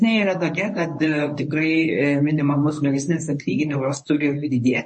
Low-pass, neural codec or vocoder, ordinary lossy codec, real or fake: 10.8 kHz; codec, 24 kHz, 0.9 kbps, WavTokenizer, medium speech release version 1; MP3, 32 kbps; fake